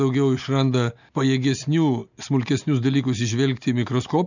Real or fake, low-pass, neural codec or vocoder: real; 7.2 kHz; none